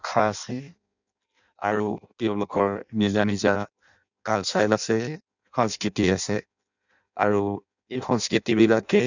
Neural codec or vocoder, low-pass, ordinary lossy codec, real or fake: codec, 16 kHz in and 24 kHz out, 0.6 kbps, FireRedTTS-2 codec; 7.2 kHz; none; fake